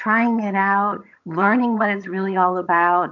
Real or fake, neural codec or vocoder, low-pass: fake; vocoder, 22.05 kHz, 80 mel bands, HiFi-GAN; 7.2 kHz